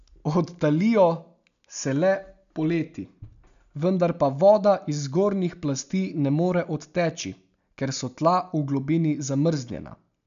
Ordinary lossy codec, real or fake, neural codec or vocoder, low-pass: none; real; none; 7.2 kHz